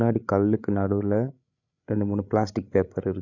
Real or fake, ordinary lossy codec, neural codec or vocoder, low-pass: fake; none; codec, 16 kHz, 8 kbps, FreqCodec, larger model; 7.2 kHz